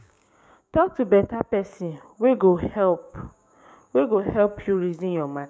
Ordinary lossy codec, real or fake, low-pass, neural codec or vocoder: none; fake; none; codec, 16 kHz, 6 kbps, DAC